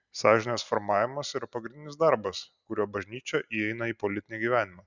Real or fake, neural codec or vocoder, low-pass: real; none; 7.2 kHz